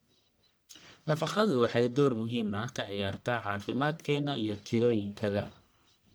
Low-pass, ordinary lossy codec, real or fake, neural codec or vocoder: none; none; fake; codec, 44.1 kHz, 1.7 kbps, Pupu-Codec